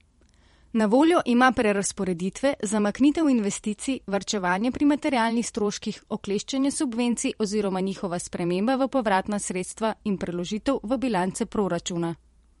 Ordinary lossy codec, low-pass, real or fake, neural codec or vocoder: MP3, 48 kbps; 19.8 kHz; fake; vocoder, 44.1 kHz, 128 mel bands every 512 samples, BigVGAN v2